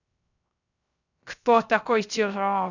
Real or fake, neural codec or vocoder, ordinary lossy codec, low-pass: fake; codec, 16 kHz, 0.3 kbps, FocalCodec; none; 7.2 kHz